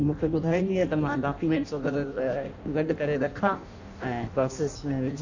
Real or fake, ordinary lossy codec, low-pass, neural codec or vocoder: fake; none; 7.2 kHz; codec, 16 kHz in and 24 kHz out, 0.6 kbps, FireRedTTS-2 codec